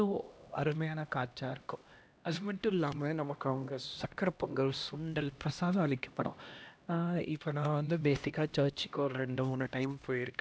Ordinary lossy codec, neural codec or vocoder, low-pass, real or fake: none; codec, 16 kHz, 1 kbps, X-Codec, HuBERT features, trained on LibriSpeech; none; fake